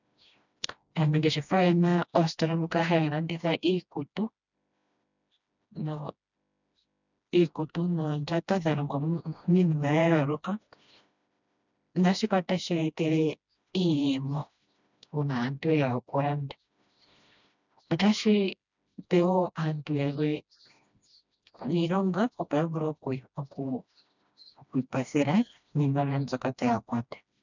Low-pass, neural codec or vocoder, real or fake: 7.2 kHz; codec, 16 kHz, 1 kbps, FreqCodec, smaller model; fake